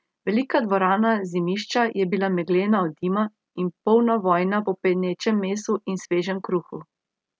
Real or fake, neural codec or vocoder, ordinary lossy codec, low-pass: real; none; none; none